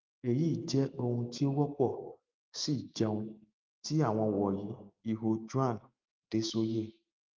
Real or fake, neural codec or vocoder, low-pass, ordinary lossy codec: fake; autoencoder, 48 kHz, 128 numbers a frame, DAC-VAE, trained on Japanese speech; 7.2 kHz; Opus, 32 kbps